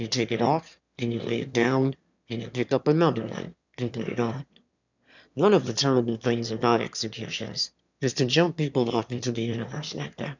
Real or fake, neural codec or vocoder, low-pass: fake; autoencoder, 22.05 kHz, a latent of 192 numbers a frame, VITS, trained on one speaker; 7.2 kHz